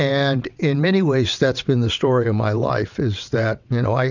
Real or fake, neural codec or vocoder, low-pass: fake; vocoder, 22.05 kHz, 80 mel bands, WaveNeXt; 7.2 kHz